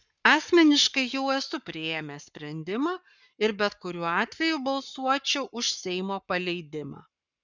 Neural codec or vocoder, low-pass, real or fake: codec, 44.1 kHz, 7.8 kbps, Pupu-Codec; 7.2 kHz; fake